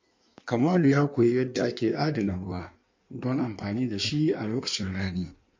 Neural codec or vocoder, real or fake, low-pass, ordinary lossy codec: codec, 16 kHz in and 24 kHz out, 1.1 kbps, FireRedTTS-2 codec; fake; 7.2 kHz; MP3, 48 kbps